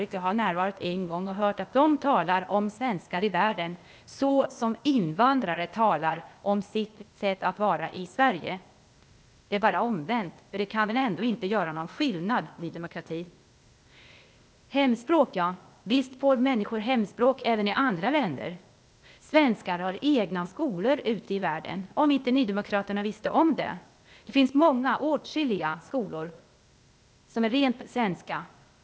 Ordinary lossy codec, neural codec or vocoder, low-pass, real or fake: none; codec, 16 kHz, 0.8 kbps, ZipCodec; none; fake